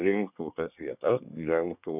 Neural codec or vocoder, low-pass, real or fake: codec, 24 kHz, 1 kbps, SNAC; 3.6 kHz; fake